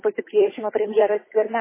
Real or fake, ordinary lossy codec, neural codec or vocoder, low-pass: fake; MP3, 16 kbps; codec, 16 kHz, 8 kbps, FunCodec, trained on Chinese and English, 25 frames a second; 3.6 kHz